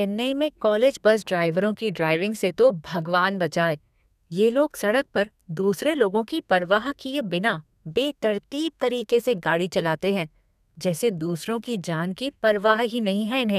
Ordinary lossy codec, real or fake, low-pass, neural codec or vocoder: none; fake; 14.4 kHz; codec, 32 kHz, 1.9 kbps, SNAC